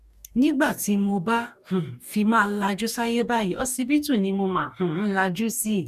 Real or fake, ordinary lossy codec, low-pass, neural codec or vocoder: fake; none; 14.4 kHz; codec, 44.1 kHz, 2.6 kbps, DAC